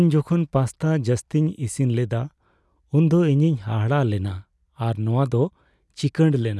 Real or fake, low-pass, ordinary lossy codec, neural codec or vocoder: real; none; none; none